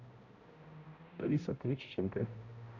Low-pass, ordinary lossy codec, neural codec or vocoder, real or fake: 7.2 kHz; Opus, 64 kbps; codec, 16 kHz, 0.5 kbps, X-Codec, HuBERT features, trained on balanced general audio; fake